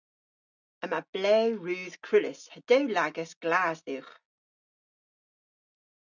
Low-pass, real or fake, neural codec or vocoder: 7.2 kHz; real; none